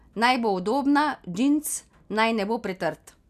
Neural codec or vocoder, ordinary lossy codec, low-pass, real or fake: none; none; 14.4 kHz; real